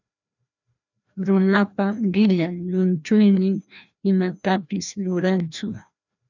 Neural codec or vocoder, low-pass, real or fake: codec, 16 kHz, 1 kbps, FreqCodec, larger model; 7.2 kHz; fake